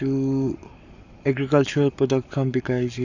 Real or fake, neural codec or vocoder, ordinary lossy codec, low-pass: fake; codec, 44.1 kHz, 7.8 kbps, Pupu-Codec; none; 7.2 kHz